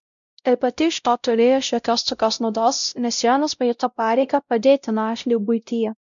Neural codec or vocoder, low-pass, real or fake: codec, 16 kHz, 0.5 kbps, X-Codec, WavLM features, trained on Multilingual LibriSpeech; 7.2 kHz; fake